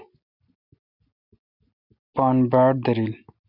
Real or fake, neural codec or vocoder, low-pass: real; none; 5.4 kHz